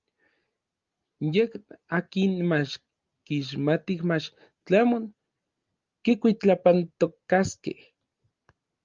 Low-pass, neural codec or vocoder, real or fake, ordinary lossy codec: 7.2 kHz; none; real; Opus, 32 kbps